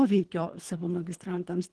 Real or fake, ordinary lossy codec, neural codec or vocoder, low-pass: fake; Opus, 16 kbps; codec, 24 kHz, 3 kbps, HILCodec; 10.8 kHz